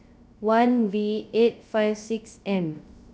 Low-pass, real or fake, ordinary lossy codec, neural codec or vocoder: none; fake; none; codec, 16 kHz, 0.3 kbps, FocalCodec